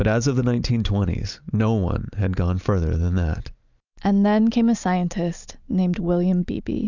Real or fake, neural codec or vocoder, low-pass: real; none; 7.2 kHz